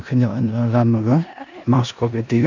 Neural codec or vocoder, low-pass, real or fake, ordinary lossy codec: codec, 16 kHz in and 24 kHz out, 0.9 kbps, LongCat-Audio-Codec, four codebook decoder; 7.2 kHz; fake; none